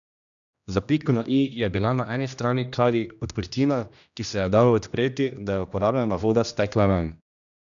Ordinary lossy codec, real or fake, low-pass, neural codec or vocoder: none; fake; 7.2 kHz; codec, 16 kHz, 1 kbps, X-Codec, HuBERT features, trained on general audio